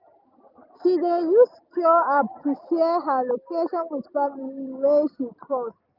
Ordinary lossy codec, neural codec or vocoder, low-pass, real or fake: none; none; 5.4 kHz; real